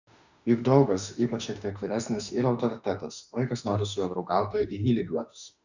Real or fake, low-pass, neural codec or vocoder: fake; 7.2 kHz; autoencoder, 48 kHz, 32 numbers a frame, DAC-VAE, trained on Japanese speech